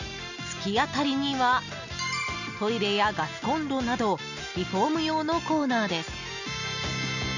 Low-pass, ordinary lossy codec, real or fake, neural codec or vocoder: 7.2 kHz; none; real; none